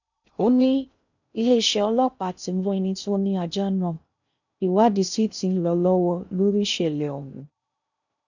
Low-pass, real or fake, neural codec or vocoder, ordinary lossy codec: 7.2 kHz; fake; codec, 16 kHz in and 24 kHz out, 0.6 kbps, FocalCodec, streaming, 2048 codes; none